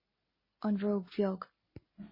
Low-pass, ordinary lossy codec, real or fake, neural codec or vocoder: 5.4 kHz; MP3, 24 kbps; real; none